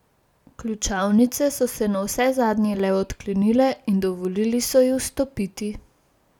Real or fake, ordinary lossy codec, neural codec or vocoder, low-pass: real; none; none; 19.8 kHz